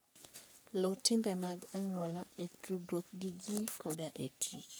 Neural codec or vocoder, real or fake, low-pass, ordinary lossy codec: codec, 44.1 kHz, 3.4 kbps, Pupu-Codec; fake; none; none